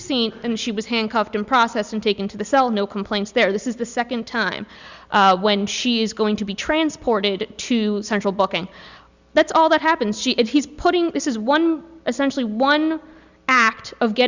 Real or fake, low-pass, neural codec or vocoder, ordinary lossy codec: real; 7.2 kHz; none; Opus, 64 kbps